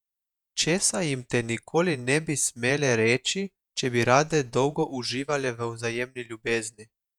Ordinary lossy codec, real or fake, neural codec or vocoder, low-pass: none; fake; vocoder, 48 kHz, 128 mel bands, Vocos; 19.8 kHz